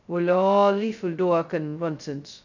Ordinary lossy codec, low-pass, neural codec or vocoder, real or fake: none; 7.2 kHz; codec, 16 kHz, 0.2 kbps, FocalCodec; fake